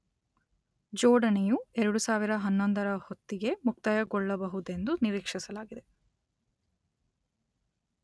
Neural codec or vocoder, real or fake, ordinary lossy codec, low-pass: none; real; none; none